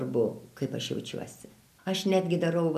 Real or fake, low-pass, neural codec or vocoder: real; 14.4 kHz; none